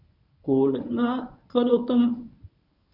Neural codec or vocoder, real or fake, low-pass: codec, 24 kHz, 0.9 kbps, WavTokenizer, medium speech release version 1; fake; 5.4 kHz